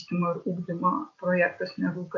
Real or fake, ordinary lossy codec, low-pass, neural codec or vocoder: real; AAC, 64 kbps; 7.2 kHz; none